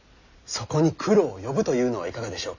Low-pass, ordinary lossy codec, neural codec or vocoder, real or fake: 7.2 kHz; none; none; real